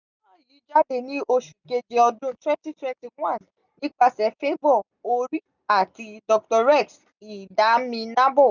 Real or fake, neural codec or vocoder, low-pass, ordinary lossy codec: real; none; 7.2 kHz; none